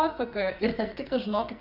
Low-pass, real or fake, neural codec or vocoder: 5.4 kHz; fake; codec, 44.1 kHz, 2.6 kbps, SNAC